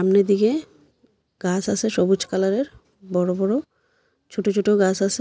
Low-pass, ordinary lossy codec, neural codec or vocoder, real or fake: none; none; none; real